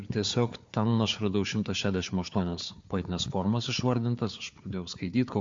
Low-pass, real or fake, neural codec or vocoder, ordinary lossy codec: 7.2 kHz; fake; codec, 16 kHz, 4 kbps, FunCodec, trained on Chinese and English, 50 frames a second; MP3, 48 kbps